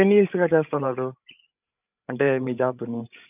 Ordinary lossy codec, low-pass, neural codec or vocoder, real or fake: none; 3.6 kHz; codec, 16 kHz, 8 kbps, FreqCodec, larger model; fake